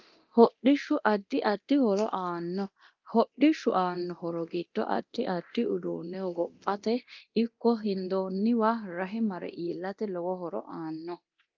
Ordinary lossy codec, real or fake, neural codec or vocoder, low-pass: Opus, 24 kbps; fake; codec, 24 kHz, 0.9 kbps, DualCodec; 7.2 kHz